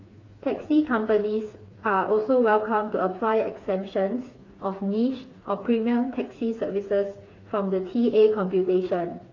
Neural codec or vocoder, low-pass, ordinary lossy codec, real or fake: codec, 16 kHz, 4 kbps, FreqCodec, smaller model; 7.2 kHz; AAC, 32 kbps; fake